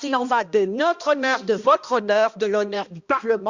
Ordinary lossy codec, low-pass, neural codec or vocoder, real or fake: Opus, 64 kbps; 7.2 kHz; codec, 16 kHz, 1 kbps, X-Codec, HuBERT features, trained on balanced general audio; fake